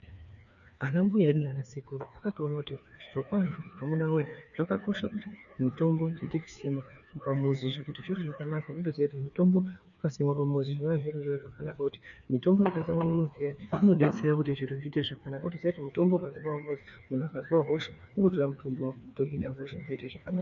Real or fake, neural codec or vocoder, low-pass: fake; codec, 16 kHz, 2 kbps, FreqCodec, larger model; 7.2 kHz